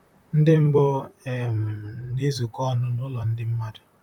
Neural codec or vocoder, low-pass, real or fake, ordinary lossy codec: vocoder, 44.1 kHz, 128 mel bands, Pupu-Vocoder; 19.8 kHz; fake; none